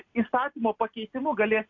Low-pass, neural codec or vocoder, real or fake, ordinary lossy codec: 7.2 kHz; none; real; MP3, 48 kbps